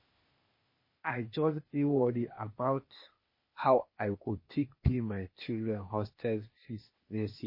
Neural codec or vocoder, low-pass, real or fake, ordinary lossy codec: codec, 16 kHz, 0.8 kbps, ZipCodec; 5.4 kHz; fake; MP3, 24 kbps